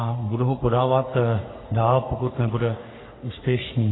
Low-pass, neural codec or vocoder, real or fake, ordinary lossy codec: 7.2 kHz; codec, 44.1 kHz, 3.4 kbps, Pupu-Codec; fake; AAC, 16 kbps